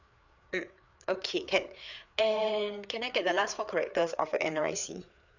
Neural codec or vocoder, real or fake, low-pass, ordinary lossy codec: codec, 16 kHz, 4 kbps, FreqCodec, larger model; fake; 7.2 kHz; none